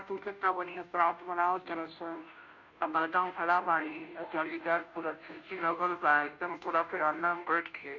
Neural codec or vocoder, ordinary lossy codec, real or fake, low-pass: codec, 16 kHz, 0.5 kbps, FunCodec, trained on Chinese and English, 25 frames a second; none; fake; 7.2 kHz